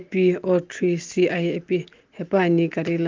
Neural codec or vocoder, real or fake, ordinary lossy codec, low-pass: none; real; Opus, 24 kbps; 7.2 kHz